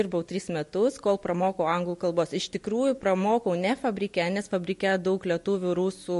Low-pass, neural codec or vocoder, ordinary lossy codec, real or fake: 14.4 kHz; none; MP3, 48 kbps; real